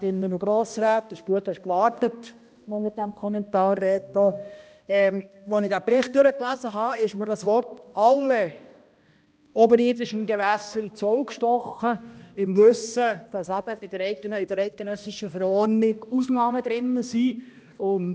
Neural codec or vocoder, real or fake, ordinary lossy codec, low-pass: codec, 16 kHz, 1 kbps, X-Codec, HuBERT features, trained on balanced general audio; fake; none; none